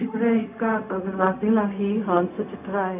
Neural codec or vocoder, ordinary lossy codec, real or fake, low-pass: codec, 16 kHz, 0.4 kbps, LongCat-Audio-Codec; none; fake; 3.6 kHz